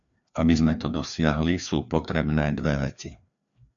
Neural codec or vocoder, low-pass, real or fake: codec, 16 kHz, 2 kbps, FreqCodec, larger model; 7.2 kHz; fake